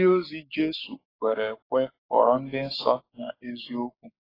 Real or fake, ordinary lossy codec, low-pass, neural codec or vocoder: fake; AAC, 24 kbps; 5.4 kHz; codec, 44.1 kHz, 7.8 kbps, Pupu-Codec